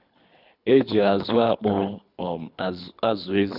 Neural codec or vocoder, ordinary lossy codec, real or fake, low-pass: codec, 24 kHz, 3 kbps, HILCodec; none; fake; 5.4 kHz